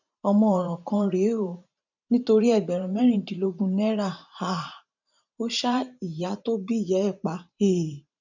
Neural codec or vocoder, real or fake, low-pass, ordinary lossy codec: vocoder, 44.1 kHz, 128 mel bands every 512 samples, BigVGAN v2; fake; 7.2 kHz; none